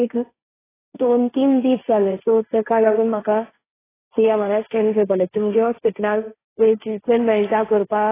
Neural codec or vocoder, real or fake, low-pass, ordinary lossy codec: codec, 16 kHz, 1.1 kbps, Voila-Tokenizer; fake; 3.6 kHz; AAC, 16 kbps